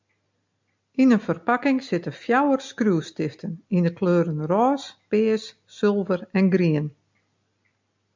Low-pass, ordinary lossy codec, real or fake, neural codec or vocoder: 7.2 kHz; MP3, 64 kbps; real; none